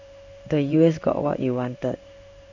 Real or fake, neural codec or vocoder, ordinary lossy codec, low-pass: fake; codec, 16 kHz in and 24 kHz out, 1 kbps, XY-Tokenizer; none; 7.2 kHz